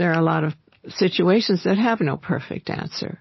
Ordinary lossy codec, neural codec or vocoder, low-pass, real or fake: MP3, 24 kbps; none; 7.2 kHz; real